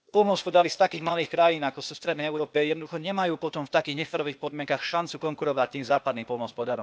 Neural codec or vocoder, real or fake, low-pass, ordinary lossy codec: codec, 16 kHz, 0.8 kbps, ZipCodec; fake; none; none